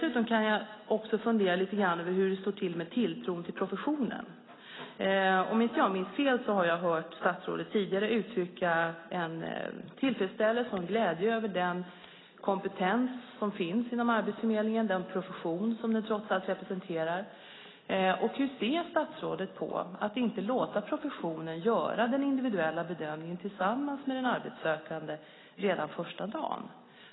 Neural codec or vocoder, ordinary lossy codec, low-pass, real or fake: none; AAC, 16 kbps; 7.2 kHz; real